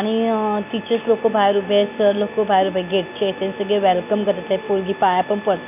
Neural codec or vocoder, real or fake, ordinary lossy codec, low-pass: none; real; none; 3.6 kHz